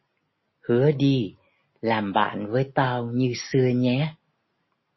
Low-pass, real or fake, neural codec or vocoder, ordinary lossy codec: 7.2 kHz; real; none; MP3, 24 kbps